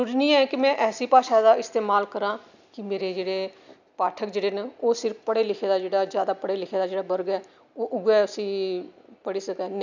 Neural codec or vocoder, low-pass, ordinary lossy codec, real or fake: none; 7.2 kHz; none; real